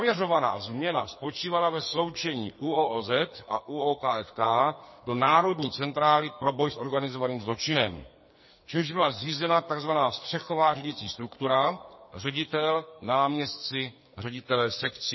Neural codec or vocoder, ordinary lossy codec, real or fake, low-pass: codec, 44.1 kHz, 2.6 kbps, SNAC; MP3, 24 kbps; fake; 7.2 kHz